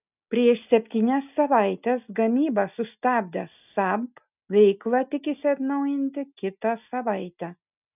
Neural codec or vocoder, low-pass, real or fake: none; 3.6 kHz; real